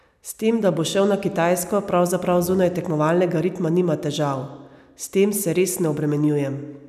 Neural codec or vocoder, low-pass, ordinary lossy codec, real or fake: none; 14.4 kHz; none; real